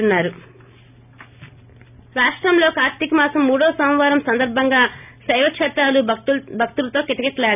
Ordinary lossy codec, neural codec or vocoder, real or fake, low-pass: none; none; real; 3.6 kHz